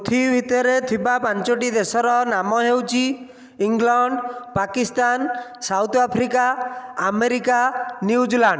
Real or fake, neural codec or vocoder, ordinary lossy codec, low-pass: real; none; none; none